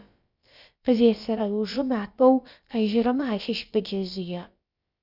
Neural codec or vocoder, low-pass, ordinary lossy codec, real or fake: codec, 16 kHz, about 1 kbps, DyCAST, with the encoder's durations; 5.4 kHz; Opus, 64 kbps; fake